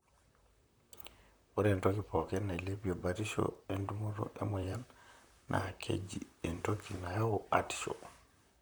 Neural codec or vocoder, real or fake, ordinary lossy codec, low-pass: vocoder, 44.1 kHz, 128 mel bands, Pupu-Vocoder; fake; none; none